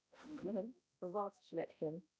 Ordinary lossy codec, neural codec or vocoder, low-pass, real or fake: none; codec, 16 kHz, 0.5 kbps, X-Codec, HuBERT features, trained on balanced general audio; none; fake